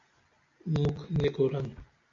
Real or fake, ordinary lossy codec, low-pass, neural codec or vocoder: real; AAC, 48 kbps; 7.2 kHz; none